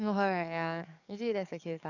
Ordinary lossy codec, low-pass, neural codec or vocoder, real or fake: none; 7.2 kHz; codec, 44.1 kHz, 7.8 kbps, DAC; fake